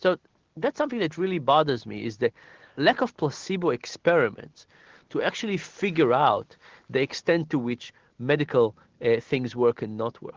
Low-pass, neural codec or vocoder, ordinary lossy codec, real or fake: 7.2 kHz; none; Opus, 16 kbps; real